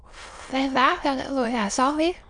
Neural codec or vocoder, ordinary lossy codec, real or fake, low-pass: autoencoder, 22.05 kHz, a latent of 192 numbers a frame, VITS, trained on many speakers; MP3, 64 kbps; fake; 9.9 kHz